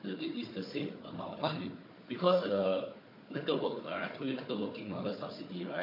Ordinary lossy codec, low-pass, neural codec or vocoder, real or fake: MP3, 32 kbps; 5.4 kHz; codec, 16 kHz, 4 kbps, FunCodec, trained on Chinese and English, 50 frames a second; fake